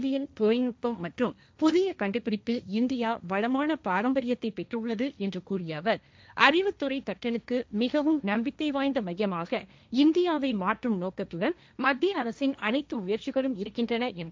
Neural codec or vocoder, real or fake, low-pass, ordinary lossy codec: codec, 16 kHz, 1.1 kbps, Voila-Tokenizer; fake; none; none